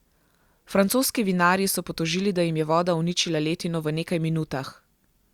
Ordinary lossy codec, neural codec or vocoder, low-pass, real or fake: Opus, 64 kbps; none; 19.8 kHz; real